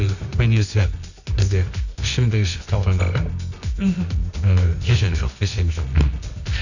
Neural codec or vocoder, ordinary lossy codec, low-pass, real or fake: codec, 24 kHz, 0.9 kbps, WavTokenizer, medium music audio release; none; 7.2 kHz; fake